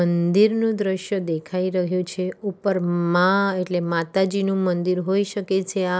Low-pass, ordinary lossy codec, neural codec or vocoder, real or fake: none; none; none; real